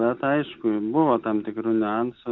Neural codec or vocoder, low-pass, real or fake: none; 7.2 kHz; real